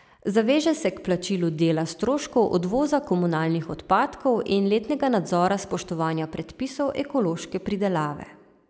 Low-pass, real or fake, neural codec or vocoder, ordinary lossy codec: none; real; none; none